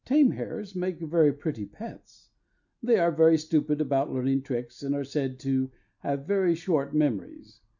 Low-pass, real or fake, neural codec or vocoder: 7.2 kHz; real; none